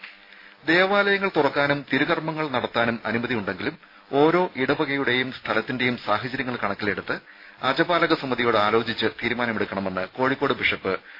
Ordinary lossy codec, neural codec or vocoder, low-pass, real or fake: AAC, 32 kbps; none; 5.4 kHz; real